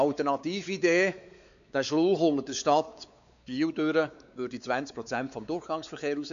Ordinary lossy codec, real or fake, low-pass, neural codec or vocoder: none; fake; 7.2 kHz; codec, 16 kHz, 4 kbps, X-Codec, WavLM features, trained on Multilingual LibriSpeech